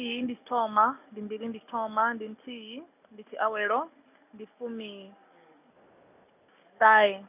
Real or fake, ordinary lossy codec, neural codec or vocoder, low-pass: real; none; none; 3.6 kHz